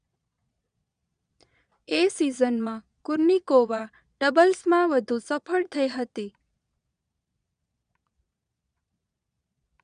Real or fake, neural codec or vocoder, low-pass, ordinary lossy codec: fake; vocoder, 22.05 kHz, 80 mel bands, Vocos; 9.9 kHz; none